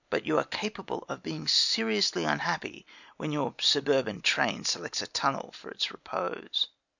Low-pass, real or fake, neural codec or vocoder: 7.2 kHz; real; none